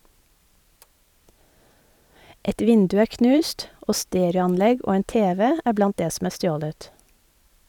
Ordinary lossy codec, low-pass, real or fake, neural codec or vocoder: none; 19.8 kHz; fake; vocoder, 44.1 kHz, 128 mel bands every 512 samples, BigVGAN v2